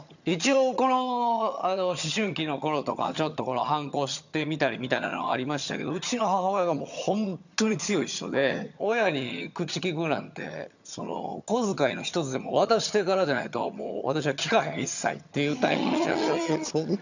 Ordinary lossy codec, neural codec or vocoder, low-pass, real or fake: none; vocoder, 22.05 kHz, 80 mel bands, HiFi-GAN; 7.2 kHz; fake